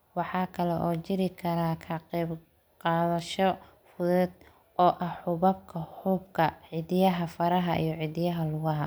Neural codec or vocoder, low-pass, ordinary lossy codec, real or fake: none; none; none; real